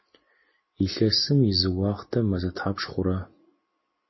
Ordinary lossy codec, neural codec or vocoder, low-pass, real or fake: MP3, 24 kbps; none; 7.2 kHz; real